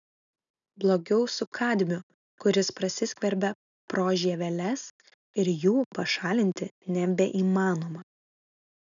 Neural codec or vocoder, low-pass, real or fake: none; 7.2 kHz; real